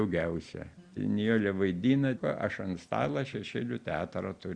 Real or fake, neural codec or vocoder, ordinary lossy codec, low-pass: real; none; Opus, 64 kbps; 9.9 kHz